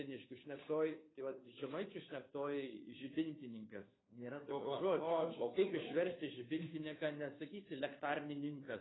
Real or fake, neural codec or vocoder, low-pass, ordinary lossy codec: fake; codec, 16 kHz, 2 kbps, FunCodec, trained on Chinese and English, 25 frames a second; 7.2 kHz; AAC, 16 kbps